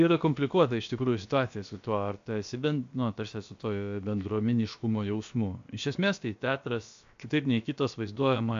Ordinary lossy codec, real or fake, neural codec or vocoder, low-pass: AAC, 64 kbps; fake; codec, 16 kHz, about 1 kbps, DyCAST, with the encoder's durations; 7.2 kHz